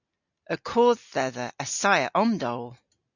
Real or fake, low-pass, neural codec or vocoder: real; 7.2 kHz; none